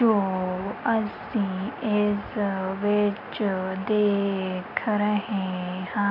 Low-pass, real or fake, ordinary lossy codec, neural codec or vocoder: 5.4 kHz; real; none; none